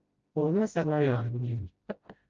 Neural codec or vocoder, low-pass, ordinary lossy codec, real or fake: codec, 16 kHz, 0.5 kbps, FreqCodec, smaller model; 7.2 kHz; Opus, 24 kbps; fake